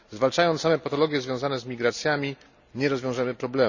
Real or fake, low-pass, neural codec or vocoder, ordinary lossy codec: real; 7.2 kHz; none; none